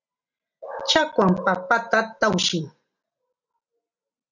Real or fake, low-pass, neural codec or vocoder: real; 7.2 kHz; none